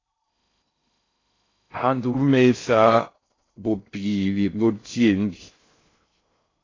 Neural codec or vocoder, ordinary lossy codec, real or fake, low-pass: codec, 16 kHz in and 24 kHz out, 0.6 kbps, FocalCodec, streaming, 2048 codes; AAC, 32 kbps; fake; 7.2 kHz